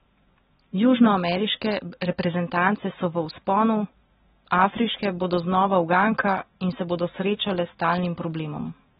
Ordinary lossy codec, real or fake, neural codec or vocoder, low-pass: AAC, 16 kbps; real; none; 7.2 kHz